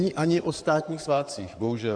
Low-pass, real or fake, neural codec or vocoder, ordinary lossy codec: 9.9 kHz; fake; codec, 16 kHz in and 24 kHz out, 2.2 kbps, FireRedTTS-2 codec; MP3, 96 kbps